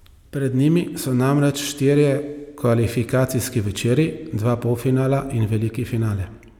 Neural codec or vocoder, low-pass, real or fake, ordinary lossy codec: none; 19.8 kHz; real; none